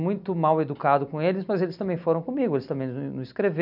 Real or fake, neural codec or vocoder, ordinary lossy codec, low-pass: real; none; none; 5.4 kHz